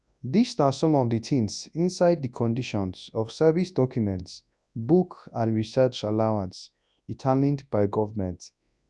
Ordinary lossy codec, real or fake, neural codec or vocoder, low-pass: none; fake; codec, 24 kHz, 0.9 kbps, WavTokenizer, large speech release; 10.8 kHz